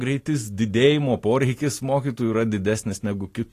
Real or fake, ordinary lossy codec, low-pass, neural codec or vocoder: real; AAC, 48 kbps; 14.4 kHz; none